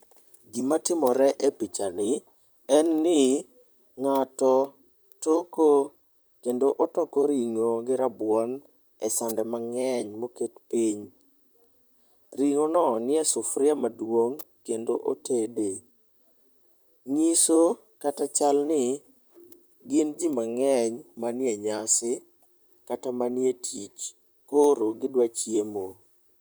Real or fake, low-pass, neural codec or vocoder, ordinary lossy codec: fake; none; vocoder, 44.1 kHz, 128 mel bands, Pupu-Vocoder; none